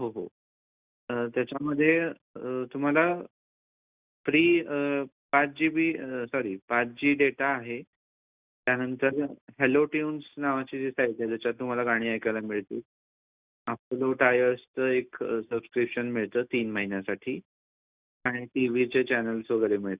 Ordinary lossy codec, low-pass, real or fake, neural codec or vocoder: Opus, 64 kbps; 3.6 kHz; real; none